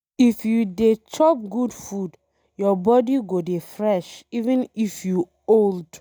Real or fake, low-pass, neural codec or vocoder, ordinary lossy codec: real; none; none; none